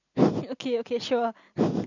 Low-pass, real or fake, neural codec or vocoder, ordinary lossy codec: 7.2 kHz; real; none; none